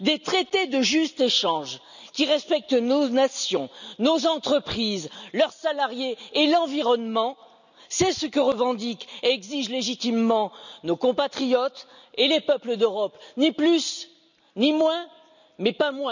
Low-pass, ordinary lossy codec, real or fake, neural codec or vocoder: 7.2 kHz; none; real; none